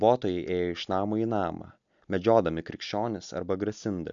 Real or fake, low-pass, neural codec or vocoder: real; 7.2 kHz; none